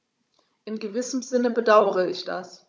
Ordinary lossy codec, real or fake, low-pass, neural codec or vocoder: none; fake; none; codec, 16 kHz, 16 kbps, FunCodec, trained on Chinese and English, 50 frames a second